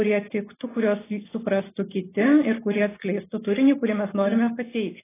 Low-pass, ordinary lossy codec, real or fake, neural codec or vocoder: 3.6 kHz; AAC, 16 kbps; real; none